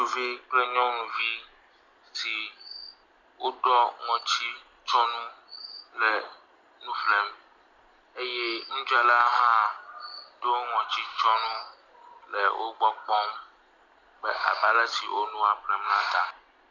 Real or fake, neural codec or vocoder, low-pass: real; none; 7.2 kHz